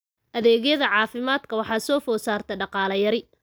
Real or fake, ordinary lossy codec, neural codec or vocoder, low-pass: real; none; none; none